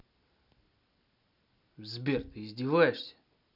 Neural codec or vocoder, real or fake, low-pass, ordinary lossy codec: none; real; 5.4 kHz; none